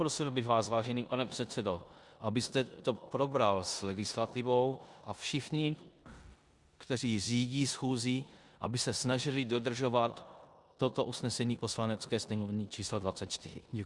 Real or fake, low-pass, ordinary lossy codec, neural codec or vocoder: fake; 10.8 kHz; Opus, 64 kbps; codec, 16 kHz in and 24 kHz out, 0.9 kbps, LongCat-Audio-Codec, four codebook decoder